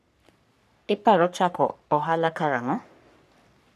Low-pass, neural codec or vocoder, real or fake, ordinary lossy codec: 14.4 kHz; codec, 44.1 kHz, 3.4 kbps, Pupu-Codec; fake; none